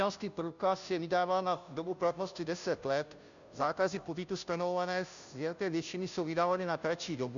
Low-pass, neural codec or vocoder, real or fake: 7.2 kHz; codec, 16 kHz, 0.5 kbps, FunCodec, trained on Chinese and English, 25 frames a second; fake